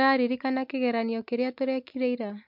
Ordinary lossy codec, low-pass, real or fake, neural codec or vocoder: MP3, 48 kbps; 5.4 kHz; real; none